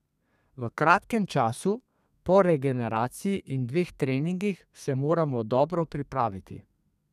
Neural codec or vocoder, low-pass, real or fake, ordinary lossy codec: codec, 32 kHz, 1.9 kbps, SNAC; 14.4 kHz; fake; none